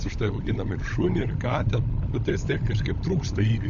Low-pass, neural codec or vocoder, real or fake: 7.2 kHz; codec, 16 kHz, 16 kbps, FunCodec, trained on LibriTTS, 50 frames a second; fake